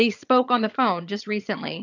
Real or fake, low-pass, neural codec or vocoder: fake; 7.2 kHz; vocoder, 22.05 kHz, 80 mel bands, HiFi-GAN